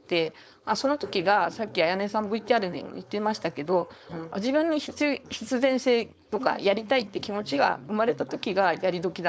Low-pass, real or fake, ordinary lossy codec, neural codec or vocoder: none; fake; none; codec, 16 kHz, 4.8 kbps, FACodec